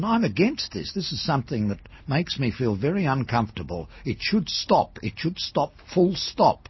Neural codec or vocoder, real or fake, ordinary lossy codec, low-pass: none; real; MP3, 24 kbps; 7.2 kHz